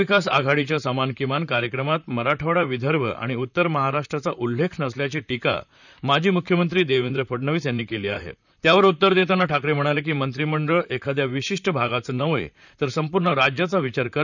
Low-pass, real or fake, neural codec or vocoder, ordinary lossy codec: 7.2 kHz; fake; vocoder, 44.1 kHz, 128 mel bands, Pupu-Vocoder; none